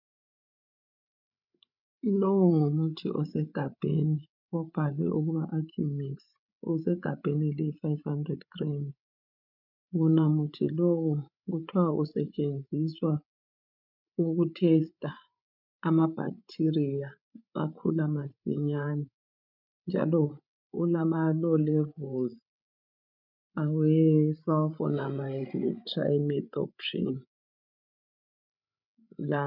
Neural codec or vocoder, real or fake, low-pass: codec, 16 kHz, 8 kbps, FreqCodec, larger model; fake; 5.4 kHz